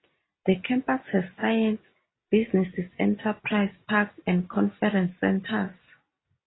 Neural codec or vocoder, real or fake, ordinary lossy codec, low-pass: none; real; AAC, 16 kbps; 7.2 kHz